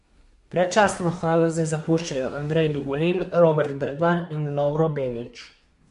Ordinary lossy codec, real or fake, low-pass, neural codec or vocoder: MP3, 64 kbps; fake; 10.8 kHz; codec, 24 kHz, 1 kbps, SNAC